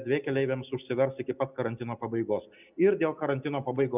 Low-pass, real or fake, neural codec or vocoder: 3.6 kHz; real; none